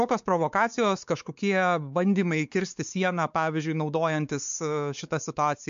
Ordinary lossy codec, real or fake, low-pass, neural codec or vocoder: MP3, 64 kbps; fake; 7.2 kHz; codec, 16 kHz, 4 kbps, X-Codec, WavLM features, trained on Multilingual LibriSpeech